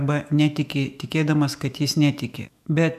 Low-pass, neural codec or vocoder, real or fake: 14.4 kHz; autoencoder, 48 kHz, 128 numbers a frame, DAC-VAE, trained on Japanese speech; fake